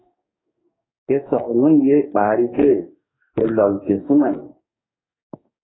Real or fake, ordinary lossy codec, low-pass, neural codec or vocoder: fake; AAC, 16 kbps; 7.2 kHz; codec, 44.1 kHz, 2.6 kbps, DAC